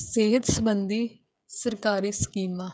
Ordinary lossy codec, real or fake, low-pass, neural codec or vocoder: none; fake; none; codec, 16 kHz, 8 kbps, FreqCodec, smaller model